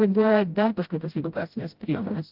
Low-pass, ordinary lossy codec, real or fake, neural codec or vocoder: 5.4 kHz; Opus, 32 kbps; fake; codec, 16 kHz, 0.5 kbps, FreqCodec, smaller model